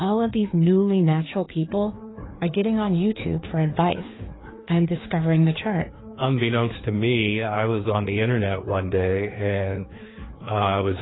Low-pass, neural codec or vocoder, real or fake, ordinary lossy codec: 7.2 kHz; codec, 16 kHz, 2 kbps, FreqCodec, larger model; fake; AAC, 16 kbps